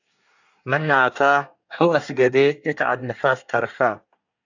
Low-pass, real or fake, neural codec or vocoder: 7.2 kHz; fake; codec, 24 kHz, 1 kbps, SNAC